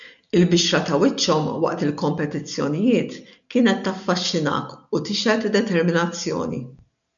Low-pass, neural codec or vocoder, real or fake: 7.2 kHz; none; real